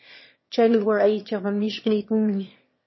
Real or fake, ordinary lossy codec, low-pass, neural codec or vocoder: fake; MP3, 24 kbps; 7.2 kHz; autoencoder, 22.05 kHz, a latent of 192 numbers a frame, VITS, trained on one speaker